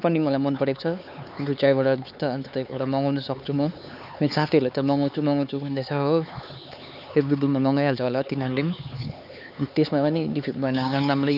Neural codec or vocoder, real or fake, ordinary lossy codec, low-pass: codec, 16 kHz, 4 kbps, X-Codec, HuBERT features, trained on LibriSpeech; fake; none; 5.4 kHz